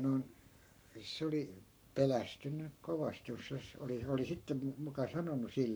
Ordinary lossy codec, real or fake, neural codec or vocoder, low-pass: none; fake; codec, 44.1 kHz, 7.8 kbps, Pupu-Codec; none